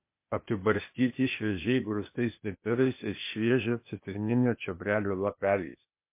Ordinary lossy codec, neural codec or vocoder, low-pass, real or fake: MP3, 24 kbps; codec, 16 kHz, 0.8 kbps, ZipCodec; 3.6 kHz; fake